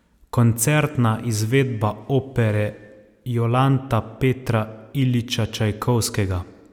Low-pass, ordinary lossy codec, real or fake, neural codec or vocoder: 19.8 kHz; none; real; none